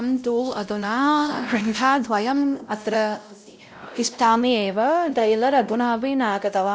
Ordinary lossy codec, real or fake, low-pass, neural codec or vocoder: none; fake; none; codec, 16 kHz, 0.5 kbps, X-Codec, WavLM features, trained on Multilingual LibriSpeech